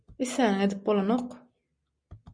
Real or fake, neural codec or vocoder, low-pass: real; none; 9.9 kHz